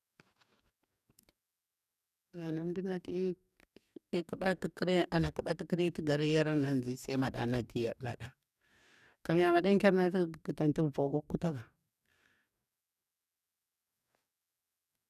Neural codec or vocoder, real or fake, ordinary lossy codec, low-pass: codec, 44.1 kHz, 2.6 kbps, DAC; fake; none; 14.4 kHz